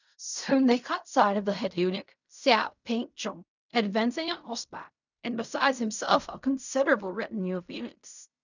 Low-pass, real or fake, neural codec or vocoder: 7.2 kHz; fake; codec, 16 kHz in and 24 kHz out, 0.4 kbps, LongCat-Audio-Codec, fine tuned four codebook decoder